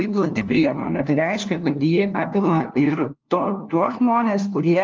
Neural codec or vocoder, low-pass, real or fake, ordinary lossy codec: codec, 16 kHz, 1 kbps, FunCodec, trained on LibriTTS, 50 frames a second; 7.2 kHz; fake; Opus, 24 kbps